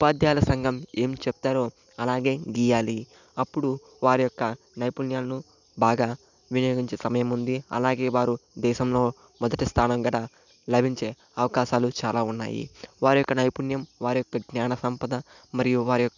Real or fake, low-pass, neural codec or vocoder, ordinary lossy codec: real; 7.2 kHz; none; none